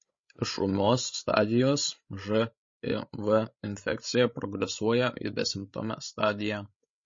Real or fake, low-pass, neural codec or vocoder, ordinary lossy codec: fake; 7.2 kHz; codec, 16 kHz, 16 kbps, FreqCodec, larger model; MP3, 32 kbps